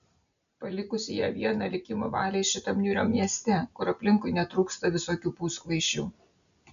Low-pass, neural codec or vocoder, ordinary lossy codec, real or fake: 7.2 kHz; none; MP3, 96 kbps; real